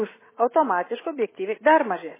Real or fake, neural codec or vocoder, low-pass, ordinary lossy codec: real; none; 3.6 kHz; MP3, 16 kbps